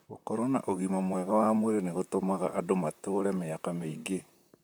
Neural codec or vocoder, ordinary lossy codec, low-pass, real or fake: vocoder, 44.1 kHz, 128 mel bands, Pupu-Vocoder; none; none; fake